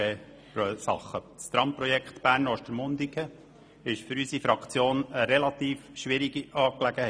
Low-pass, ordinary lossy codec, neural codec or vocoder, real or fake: none; none; none; real